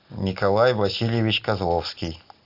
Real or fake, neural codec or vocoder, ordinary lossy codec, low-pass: real; none; AAC, 48 kbps; 5.4 kHz